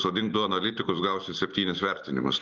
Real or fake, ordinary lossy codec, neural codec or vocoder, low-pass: real; Opus, 32 kbps; none; 7.2 kHz